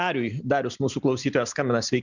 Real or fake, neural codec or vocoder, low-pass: real; none; 7.2 kHz